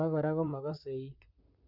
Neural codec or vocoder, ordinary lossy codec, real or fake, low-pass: vocoder, 44.1 kHz, 128 mel bands, Pupu-Vocoder; none; fake; 5.4 kHz